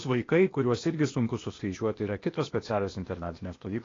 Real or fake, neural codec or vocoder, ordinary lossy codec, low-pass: fake; codec, 16 kHz, 0.8 kbps, ZipCodec; AAC, 32 kbps; 7.2 kHz